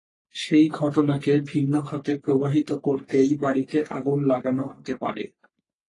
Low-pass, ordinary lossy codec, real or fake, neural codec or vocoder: 10.8 kHz; AAC, 32 kbps; fake; codec, 44.1 kHz, 3.4 kbps, Pupu-Codec